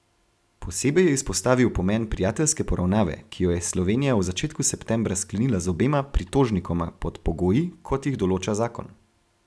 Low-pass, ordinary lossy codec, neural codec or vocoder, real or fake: none; none; none; real